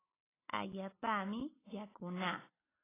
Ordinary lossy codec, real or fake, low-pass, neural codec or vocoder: AAC, 16 kbps; real; 3.6 kHz; none